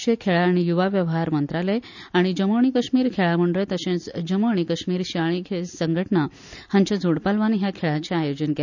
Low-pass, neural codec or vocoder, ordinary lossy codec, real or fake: 7.2 kHz; none; none; real